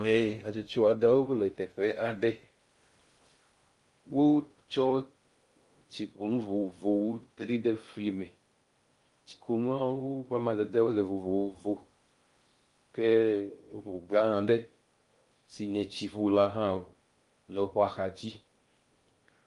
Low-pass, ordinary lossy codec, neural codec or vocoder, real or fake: 10.8 kHz; Opus, 32 kbps; codec, 16 kHz in and 24 kHz out, 0.6 kbps, FocalCodec, streaming, 2048 codes; fake